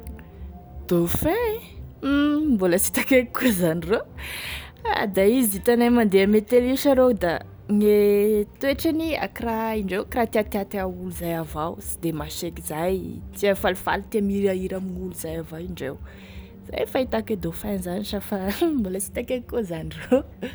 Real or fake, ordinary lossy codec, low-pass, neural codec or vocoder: real; none; none; none